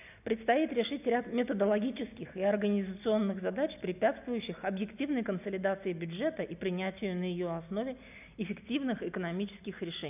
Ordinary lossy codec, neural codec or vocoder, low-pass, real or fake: none; none; 3.6 kHz; real